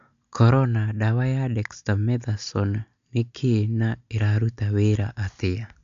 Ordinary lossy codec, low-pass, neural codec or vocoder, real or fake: MP3, 64 kbps; 7.2 kHz; none; real